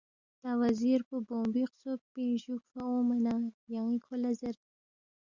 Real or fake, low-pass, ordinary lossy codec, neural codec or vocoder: real; 7.2 kHz; Opus, 64 kbps; none